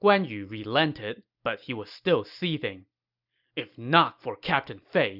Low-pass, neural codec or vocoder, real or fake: 5.4 kHz; none; real